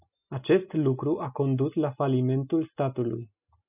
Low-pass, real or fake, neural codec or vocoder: 3.6 kHz; real; none